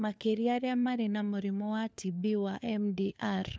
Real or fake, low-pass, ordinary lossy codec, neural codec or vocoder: fake; none; none; codec, 16 kHz, 4 kbps, FunCodec, trained on LibriTTS, 50 frames a second